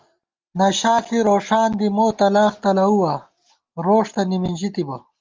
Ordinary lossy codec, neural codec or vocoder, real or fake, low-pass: Opus, 32 kbps; none; real; 7.2 kHz